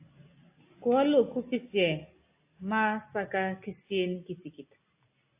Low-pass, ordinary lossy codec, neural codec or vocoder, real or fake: 3.6 kHz; AAC, 32 kbps; none; real